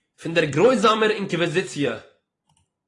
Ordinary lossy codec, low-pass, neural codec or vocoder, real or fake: AAC, 32 kbps; 10.8 kHz; vocoder, 44.1 kHz, 128 mel bands every 256 samples, BigVGAN v2; fake